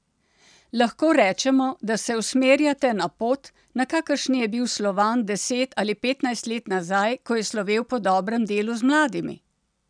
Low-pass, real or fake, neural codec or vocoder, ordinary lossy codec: 9.9 kHz; real; none; none